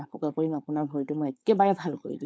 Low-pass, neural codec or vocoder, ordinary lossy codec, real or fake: none; codec, 16 kHz, 4.8 kbps, FACodec; none; fake